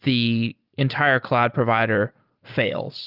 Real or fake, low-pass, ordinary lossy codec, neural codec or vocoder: real; 5.4 kHz; Opus, 32 kbps; none